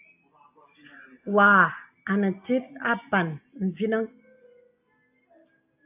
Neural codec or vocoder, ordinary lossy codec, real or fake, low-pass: none; AAC, 32 kbps; real; 3.6 kHz